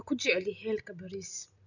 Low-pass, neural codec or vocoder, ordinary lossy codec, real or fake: 7.2 kHz; none; none; real